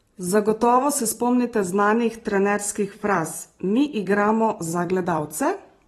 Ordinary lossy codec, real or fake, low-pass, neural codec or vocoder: AAC, 32 kbps; fake; 19.8 kHz; vocoder, 44.1 kHz, 128 mel bands every 256 samples, BigVGAN v2